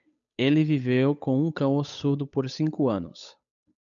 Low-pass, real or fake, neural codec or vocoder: 7.2 kHz; fake; codec, 16 kHz, 8 kbps, FunCodec, trained on Chinese and English, 25 frames a second